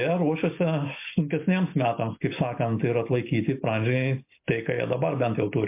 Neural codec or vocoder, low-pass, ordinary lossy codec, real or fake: none; 3.6 kHz; MP3, 32 kbps; real